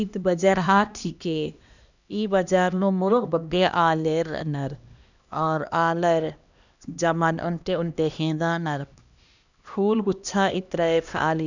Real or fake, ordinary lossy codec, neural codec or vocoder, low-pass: fake; none; codec, 16 kHz, 1 kbps, X-Codec, HuBERT features, trained on LibriSpeech; 7.2 kHz